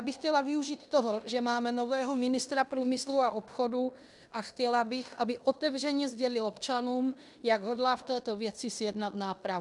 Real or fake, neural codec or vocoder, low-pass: fake; codec, 16 kHz in and 24 kHz out, 0.9 kbps, LongCat-Audio-Codec, fine tuned four codebook decoder; 10.8 kHz